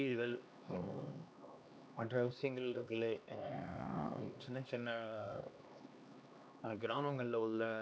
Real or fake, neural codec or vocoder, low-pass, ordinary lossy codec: fake; codec, 16 kHz, 2 kbps, X-Codec, HuBERT features, trained on LibriSpeech; none; none